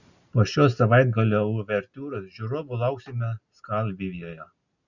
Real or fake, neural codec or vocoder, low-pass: real; none; 7.2 kHz